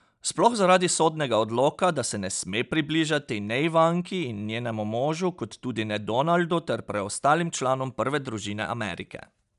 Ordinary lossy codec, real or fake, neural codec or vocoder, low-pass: none; real; none; 10.8 kHz